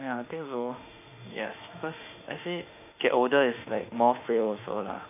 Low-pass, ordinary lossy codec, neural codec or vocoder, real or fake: 3.6 kHz; none; autoencoder, 48 kHz, 32 numbers a frame, DAC-VAE, trained on Japanese speech; fake